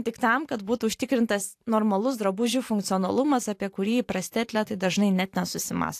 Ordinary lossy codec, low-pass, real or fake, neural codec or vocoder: AAC, 64 kbps; 14.4 kHz; fake; vocoder, 44.1 kHz, 128 mel bands every 512 samples, BigVGAN v2